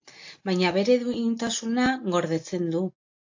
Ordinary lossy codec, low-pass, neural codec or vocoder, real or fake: AAC, 48 kbps; 7.2 kHz; none; real